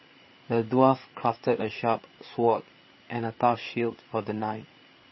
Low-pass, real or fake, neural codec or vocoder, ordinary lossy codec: 7.2 kHz; fake; codec, 16 kHz, 8 kbps, FreqCodec, larger model; MP3, 24 kbps